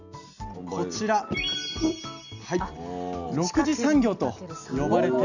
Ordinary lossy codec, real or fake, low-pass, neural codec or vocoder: none; real; 7.2 kHz; none